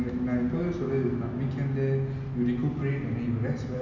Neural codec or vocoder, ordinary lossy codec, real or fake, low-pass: none; MP3, 64 kbps; real; 7.2 kHz